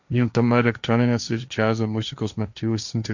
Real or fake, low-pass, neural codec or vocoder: fake; 7.2 kHz; codec, 16 kHz, 1.1 kbps, Voila-Tokenizer